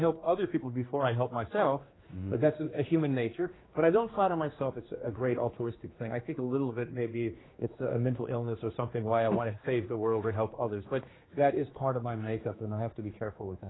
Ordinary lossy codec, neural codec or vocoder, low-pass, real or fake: AAC, 16 kbps; codec, 16 kHz, 2 kbps, X-Codec, HuBERT features, trained on general audio; 7.2 kHz; fake